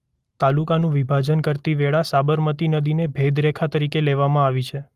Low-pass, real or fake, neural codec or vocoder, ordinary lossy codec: 14.4 kHz; real; none; Opus, 32 kbps